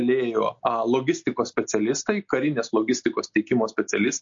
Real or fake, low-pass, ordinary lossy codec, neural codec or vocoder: real; 7.2 kHz; MP3, 48 kbps; none